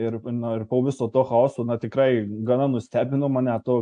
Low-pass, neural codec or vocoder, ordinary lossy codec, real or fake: 9.9 kHz; none; AAC, 64 kbps; real